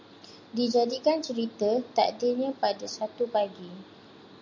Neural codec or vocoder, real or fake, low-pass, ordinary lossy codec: none; real; 7.2 kHz; MP3, 64 kbps